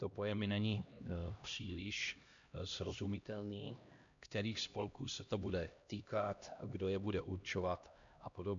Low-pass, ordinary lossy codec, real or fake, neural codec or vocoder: 7.2 kHz; MP3, 64 kbps; fake; codec, 16 kHz, 1 kbps, X-Codec, HuBERT features, trained on LibriSpeech